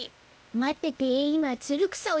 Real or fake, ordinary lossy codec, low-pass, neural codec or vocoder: fake; none; none; codec, 16 kHz, 0.8 kbps, ZipCodec